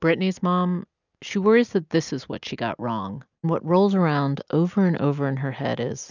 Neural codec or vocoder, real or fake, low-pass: none; real; 7.2 kHz